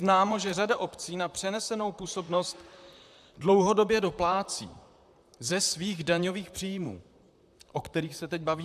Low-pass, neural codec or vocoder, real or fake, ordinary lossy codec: 14.4 kHz; vocoder, 44.1 kHz, 128 mel bands, Pupu-Vocoder; fake; AAC, 96 kbps